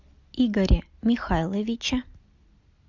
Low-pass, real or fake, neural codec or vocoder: 7.2 kHz; real; none